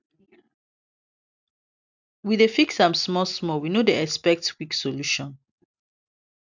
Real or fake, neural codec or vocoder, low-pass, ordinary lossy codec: real; none; 7.2 kHz; none